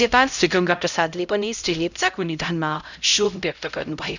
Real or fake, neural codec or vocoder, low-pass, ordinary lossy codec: fake; codec, 16 kHz, 0.5 kbps, X-Codec, HuBERT features, trained on LibriSpeech; 7.2 kHz; none